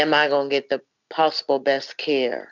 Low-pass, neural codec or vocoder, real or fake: 7.2 kHz; none; real